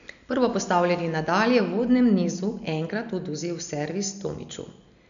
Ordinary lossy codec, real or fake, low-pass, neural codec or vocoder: none; real; 7.2 kHz; none